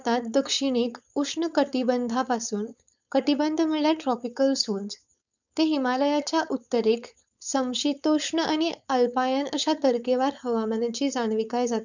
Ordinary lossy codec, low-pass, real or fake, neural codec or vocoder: none; 7.2 kHz; fake; codec, 16 kHz, 4.8 kbps, FACodec